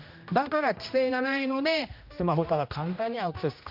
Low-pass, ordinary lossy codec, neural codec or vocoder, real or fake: 5.4 kHz; MP3, 48 kbps; codec, 16 kHz, 1 kbps, X-Codec, HuBERT features, trained on general audio; fake